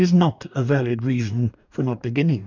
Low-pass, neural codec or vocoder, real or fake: 7.2 kHz; codec, 44.1 kHz, 2.6 kbps, DAC; fake